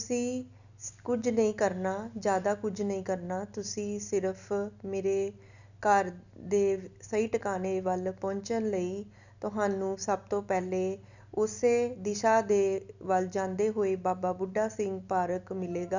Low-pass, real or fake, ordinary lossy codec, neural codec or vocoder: 7.2 kHz; real; none; none